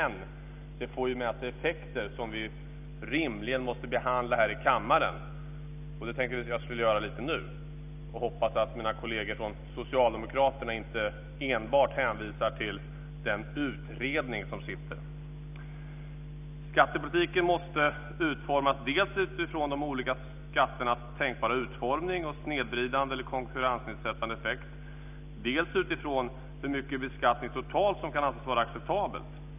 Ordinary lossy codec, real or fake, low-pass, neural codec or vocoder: none; real; 3.6 kHz; none